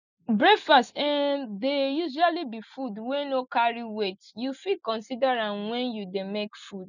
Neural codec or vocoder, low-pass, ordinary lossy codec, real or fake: none; 7.2 kHz; none; real